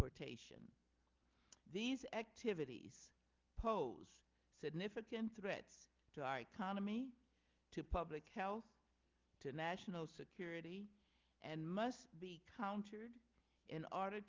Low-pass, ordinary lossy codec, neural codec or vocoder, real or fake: 7.2 kHz; Opus, 32 kbps; none; real